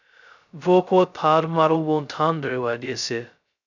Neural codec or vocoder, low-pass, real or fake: codec, 16 kHz, 0.2 kbps, FocalCodec; 7.2 kHz; fake